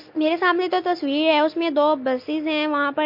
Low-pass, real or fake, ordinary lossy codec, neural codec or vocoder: 5.4 kHz; real; MP3, 32 kbps; none